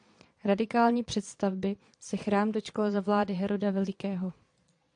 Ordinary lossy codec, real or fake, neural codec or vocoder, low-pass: AAC, 64 kbps; fake; vocoder, 22.05 kHz, 80 mel bands, Vocos; 9.9 kHz